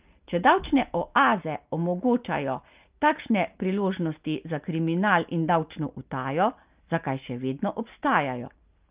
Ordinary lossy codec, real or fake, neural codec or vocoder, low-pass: Opus, 32 kbps; real; none; 3.6 kHz